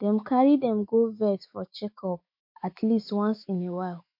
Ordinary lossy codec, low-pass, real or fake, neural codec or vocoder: MP3, 32 kbps; 5.4 kHz; fake; autoencoder, 48 kHz, 128 numbers a frame, DAC-VAE, trained on Japanese speech